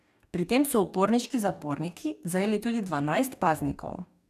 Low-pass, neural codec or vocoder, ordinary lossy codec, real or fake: 14.4 kHz; codec, 44.1 kHz, 2.6 kbps, DAC; none; fake